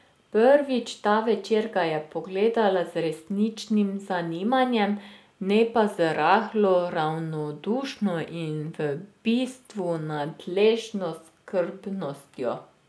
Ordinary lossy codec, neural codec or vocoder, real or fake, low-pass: none; none; real; none